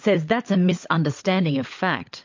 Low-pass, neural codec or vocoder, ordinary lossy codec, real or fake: 7.2 kHz; codec, 16 kHz, 16 kbps, FunCodec, trained on LibriTTS, 50 frames a second; MP3, 48 kbps; fake